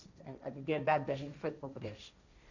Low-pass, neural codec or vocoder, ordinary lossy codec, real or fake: 7.2 kHz; codec, 16 kHz, 1.1 kbps, Voila-Tokenizer; none; fake